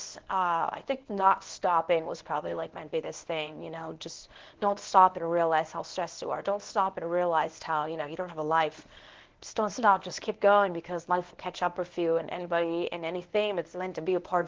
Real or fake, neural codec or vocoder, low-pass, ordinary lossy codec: fake; codec, 24 kHz, 0.9 kbps, WavTokenizer, medium speech release version 1; 7.2 kHz; Opus, 16 kbps